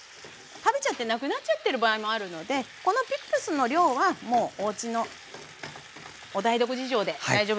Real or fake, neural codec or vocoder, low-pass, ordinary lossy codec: real; none; none; none